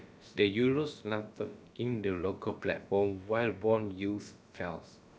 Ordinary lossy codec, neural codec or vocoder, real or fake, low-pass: none; codec, 16 kHz, about 1 kbps, DyCAST, with the encoder's durations; fake; none